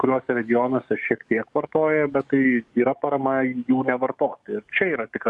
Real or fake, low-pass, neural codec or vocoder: fake; 10.8 kHz; codec, 44.1 kHz, 7.8 kbps, DAC